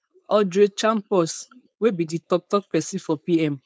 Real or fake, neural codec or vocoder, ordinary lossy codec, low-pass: fake; codec, 16 kHz, 4.8 kbps, FACodec; none; none